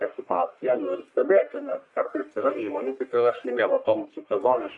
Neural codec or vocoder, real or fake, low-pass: codec, 44.1 kHz, 1.7 kbps, Pupu-Codec; fake; 10.8 kHz